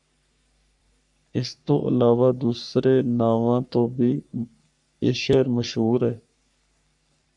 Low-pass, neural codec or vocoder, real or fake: 10.8 kHz; codec, 44.1 kHz, 3.4 kbps, Pupu-Codec; fake